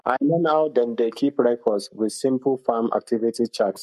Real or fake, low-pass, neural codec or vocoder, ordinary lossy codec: fake; 14.4 kHz; codec, 44.1 kHz, 7.8 kbps, Pupu-Codec; MP3, 64 kbps